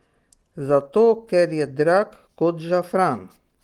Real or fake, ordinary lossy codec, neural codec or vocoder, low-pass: real; Opus, 24 kbps; none; 19.8 kHz